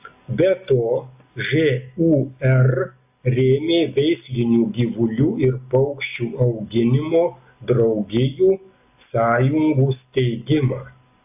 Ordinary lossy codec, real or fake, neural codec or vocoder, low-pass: AAC, 32 kbps; real; none; 3.6 kHz